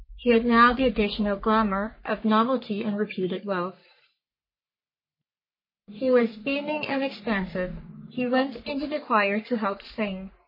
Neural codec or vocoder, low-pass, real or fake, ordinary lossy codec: codec, 44.1 kHz, 3.4 kbps, Pupu-Codec; 5.4 kHz; fake; MP3, 24 kbps